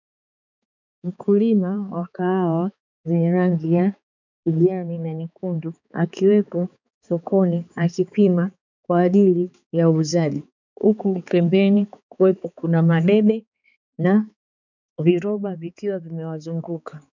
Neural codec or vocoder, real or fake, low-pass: codec, 16 kHz, 4 kbps, X-Codec, HuBERT features, trained on balanced general audio; fake; 7.2 kHz